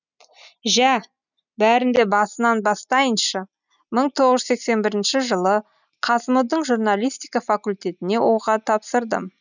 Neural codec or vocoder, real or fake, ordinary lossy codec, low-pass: none; real; none; 7.2 kHz